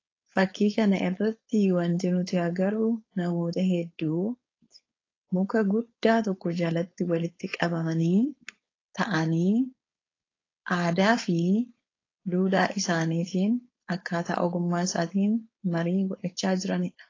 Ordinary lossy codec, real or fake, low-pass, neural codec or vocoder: AAC, 32 kbps; fake; 7.2 kHz; codec, 16 kHz, 4.8 kbps, FACodec